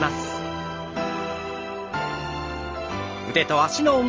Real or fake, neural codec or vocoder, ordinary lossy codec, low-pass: real; none; Opus, 24 kbps; 7.2 kHz